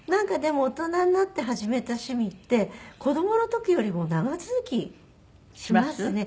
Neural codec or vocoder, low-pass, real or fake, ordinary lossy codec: none; none; real; none